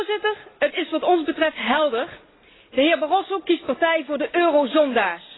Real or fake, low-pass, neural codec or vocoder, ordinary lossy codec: real; 7.2 kHz; none; AAC, 16 kbps